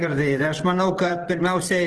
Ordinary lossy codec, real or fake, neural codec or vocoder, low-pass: Opus, 16 kbps; fake; vocoder, 24 kHz, 100 mel bands, Vocos; 10.8 kHz